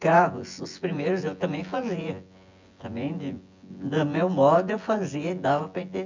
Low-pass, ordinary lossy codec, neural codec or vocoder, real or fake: 7.2 kHz; MP3, 64 kbps; vocoder, 24 kHz, 100 mel bands, Vocos; fake